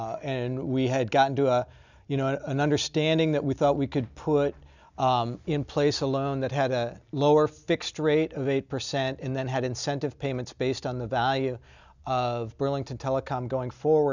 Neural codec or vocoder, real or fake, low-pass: none; real; 7.2 kHz